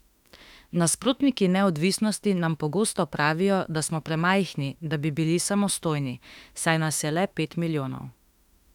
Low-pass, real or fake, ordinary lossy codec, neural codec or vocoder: 19.8 kHz; fake; none; autoencoder, 48 kHz, 32 numbers a frame, DAC-VAE, trained on Japanese speech